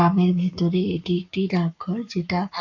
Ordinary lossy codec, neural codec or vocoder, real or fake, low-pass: none; codec, 44.1 kHz, 7.8 kbps, Pupu-Codec; fake; 7.2 kHz